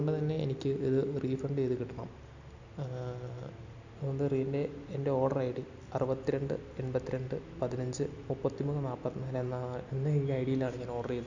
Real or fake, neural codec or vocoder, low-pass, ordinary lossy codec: real; none; 7.2 kHz; none